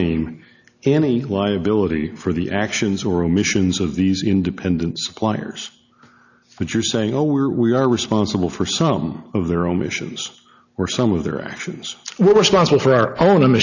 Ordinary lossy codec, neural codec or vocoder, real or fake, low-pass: AAC, 48 kbps; none; real; 7.2 kHz